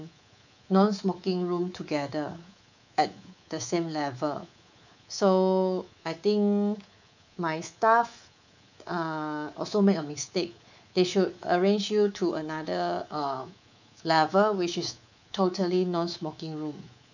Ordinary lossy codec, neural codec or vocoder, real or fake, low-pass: none; codec, 24 kHz, 3.1 kbps, DualCodec; fake; 7.2 kHz